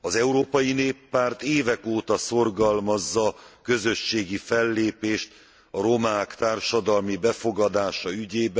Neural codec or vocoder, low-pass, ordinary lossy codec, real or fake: none; none; none; real